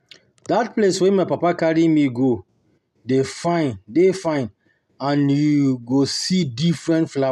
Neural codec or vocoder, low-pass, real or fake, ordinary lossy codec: none; 14.4 kHz; real; MP3, 96 kbps